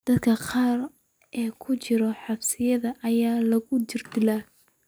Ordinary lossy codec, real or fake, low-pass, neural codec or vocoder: none; real; none; none